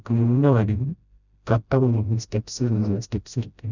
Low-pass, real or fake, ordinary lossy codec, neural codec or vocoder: 7.2 kHz; fake; none; codec, 16 kHz, 0.5 kbps, FreqCodec, smaller model